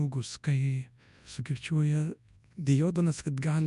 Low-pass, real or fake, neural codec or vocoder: 10.8 kHz; fake; codec, 24 kHz, 0.9 kbps, WavTokenizer, large speech release